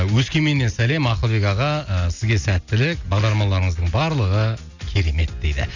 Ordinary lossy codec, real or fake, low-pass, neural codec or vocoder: none; real; 7.2 kHz; none